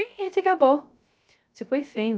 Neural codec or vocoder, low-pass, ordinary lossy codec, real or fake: codec, 16 kHz, 0.3 kbps, FocalCodec; none; none; fake